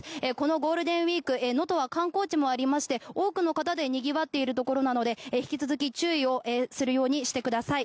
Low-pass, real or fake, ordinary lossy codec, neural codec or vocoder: none; real; none; none